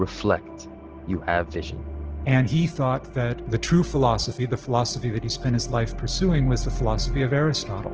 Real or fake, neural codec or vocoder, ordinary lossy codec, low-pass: real; none; Opus, 16 kbps; 7.2 kHz